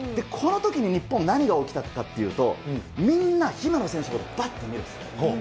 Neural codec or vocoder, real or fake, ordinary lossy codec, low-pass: none; real; none; none